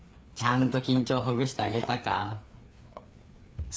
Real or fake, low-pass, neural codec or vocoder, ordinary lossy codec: fake; none; codec, 16 kHz, 4 kbps, FreqCodec, larger model; none